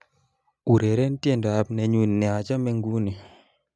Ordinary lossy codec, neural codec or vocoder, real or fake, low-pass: none; none; real; none